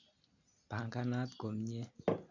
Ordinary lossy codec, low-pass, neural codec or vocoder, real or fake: none; 7.2 kHz; none; real